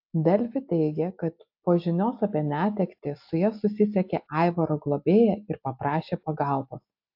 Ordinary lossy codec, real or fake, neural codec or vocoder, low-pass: AAC, 48 kbps; real; none; 5.4 kHz